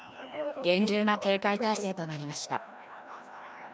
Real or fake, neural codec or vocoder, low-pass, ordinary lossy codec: fake; codec, 16 kHz, 1 kbps, FreqCodec, larger model; none; none